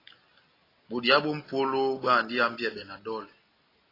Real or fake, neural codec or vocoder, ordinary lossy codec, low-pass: real; none; AAC, 24 kbps; 5.4 kHz